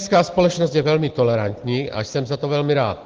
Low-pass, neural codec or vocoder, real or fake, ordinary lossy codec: 7.2 kHz; none; real; Opus, 16 kbps